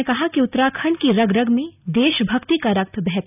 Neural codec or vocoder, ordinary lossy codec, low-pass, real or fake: none; none; 3.6 kHz; real